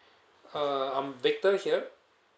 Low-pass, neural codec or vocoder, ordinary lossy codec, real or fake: none; none; none; real